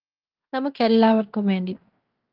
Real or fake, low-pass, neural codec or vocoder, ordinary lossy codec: fake; 5.4 kHz; codec, 16 kHz in and 24 kHz out, 0.9 kbps, LongCat-Audio-Codec, fine tuned four codebook decoder; Opus, 24 kbps